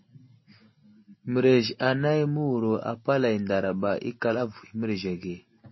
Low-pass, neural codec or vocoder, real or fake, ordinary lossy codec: 7.2 kHz; none; real; MP3, 24 kbps